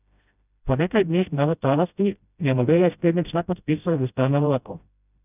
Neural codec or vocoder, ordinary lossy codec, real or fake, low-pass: codec, 16 kHz, 0.5 kbps, FreqCodec, smaller model; none; fake; 3.6 kHz